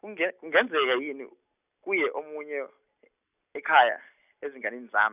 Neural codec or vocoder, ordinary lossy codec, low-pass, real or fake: none; none; 3.6 kHz; real